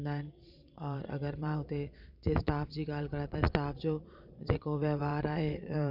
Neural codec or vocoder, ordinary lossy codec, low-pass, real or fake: vocoder, 22.05 kHz, 80 mel bands, Vocos; none; 5.4 kHz; fake